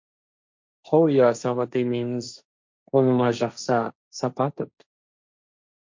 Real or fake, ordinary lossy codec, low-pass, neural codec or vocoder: fake; MP3, 48 kbps; 7.2 kHz; codec, 16 kHz, 1.1 kbps, Voila-Tokenizer